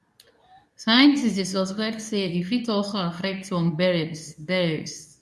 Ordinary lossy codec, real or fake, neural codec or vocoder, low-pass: none; fake; codec, 24 kHz, 0.9 kbps, WavTokenizer, medium speech release version 2; none